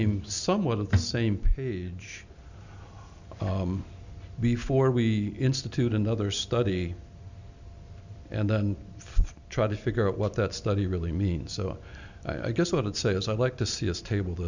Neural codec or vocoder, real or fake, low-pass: none; real; 7.2 kHz